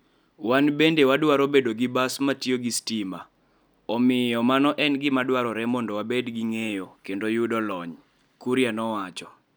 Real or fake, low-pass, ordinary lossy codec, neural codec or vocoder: real; none; none; none